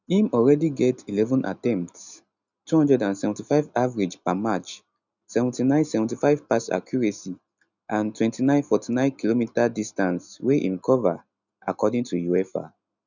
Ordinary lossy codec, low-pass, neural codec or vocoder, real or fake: none; 7.2 kHz; none; real